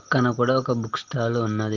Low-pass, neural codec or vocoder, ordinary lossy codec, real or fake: 7.2 kHz; none; Opus, 16 kbps; real